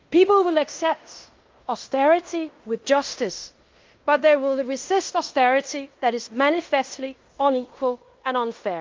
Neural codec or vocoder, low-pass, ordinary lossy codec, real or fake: codec, 16 kHz in and 24 kHz out, 0.9 kbps, LongCat-Audio-Codec, fine tuned four codebook decoder; 7.2 kHz; Opus, 24 kbps; fake